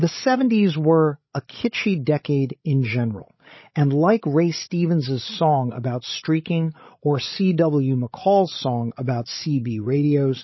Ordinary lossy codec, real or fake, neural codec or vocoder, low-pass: MP3, 24 kbps; fake; codec, 16 kHz, 16 kbps, FreqCodec, larger model; 7.2 kHz